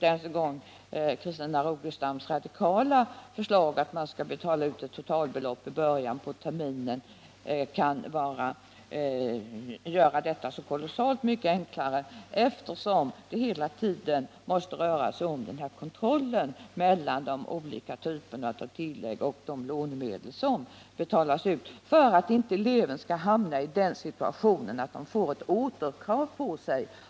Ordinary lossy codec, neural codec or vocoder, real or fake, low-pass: none; none; real; none